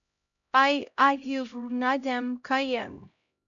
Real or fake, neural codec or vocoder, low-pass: fake; codec, 16 kHz, 0.5 kbps, X-Codec, HuBERT features, trained on LibriSpeech; 7.2 kHz